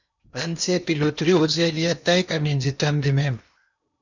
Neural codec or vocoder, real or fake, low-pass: codec, 16 kHz in and 24 kHz out, 0.8 kbps, FocalCodec, streaming, 65536 codes; fake; 7.2 kHz